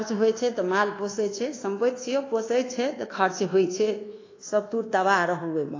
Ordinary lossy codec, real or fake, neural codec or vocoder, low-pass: AAC, 32 kbps; fake; codec, 24 kHz, 1.2 kbps, DualCodec; 7.2 kHz